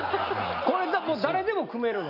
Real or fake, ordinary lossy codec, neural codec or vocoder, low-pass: real; none; none; 5.4 kHz